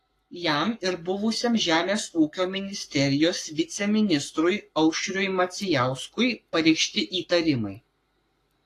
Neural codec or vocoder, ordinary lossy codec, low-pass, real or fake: codec, 44.1 kHz, 7.8 kbps, Pupu-Codec; AAC, 48 kbps; 14.4 kHz; fake